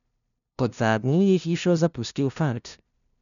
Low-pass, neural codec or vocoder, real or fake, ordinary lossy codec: 7.2 kHz; codec, 16 kHz, 0.5 kbps, FunCodec, trained on LibriTTS, 25 frames a second; fake; none